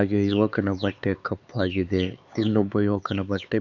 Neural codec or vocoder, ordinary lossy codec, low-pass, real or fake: codec, 16 kHz, 4 kbps, X-Codec, HuBERT features, trained on LibriSpeech; Opus, 64 kbps; 7.2 kHz; fake